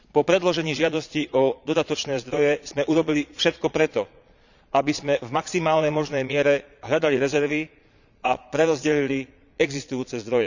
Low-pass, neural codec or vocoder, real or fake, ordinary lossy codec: 7.2 kHz; vocoder, 22.05 kHz, 80 mel bands, Vocos; fake; none